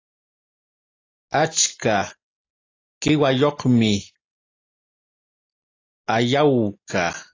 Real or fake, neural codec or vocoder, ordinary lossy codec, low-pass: real; none; AAC, 32 kbps; 7.2 kHz